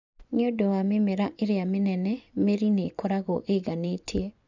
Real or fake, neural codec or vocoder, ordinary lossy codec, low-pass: fake; vocoder, 24 kHz, 100 mel bands, Vocos; none; 7.2 kHz